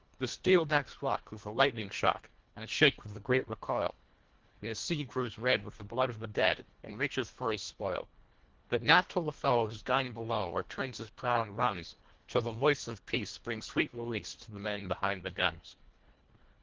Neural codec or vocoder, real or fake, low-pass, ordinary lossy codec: codec, 24 kHz, 1.5 kbps, HILCodec; fake; 7.2 kHz; Opus, 16 kbps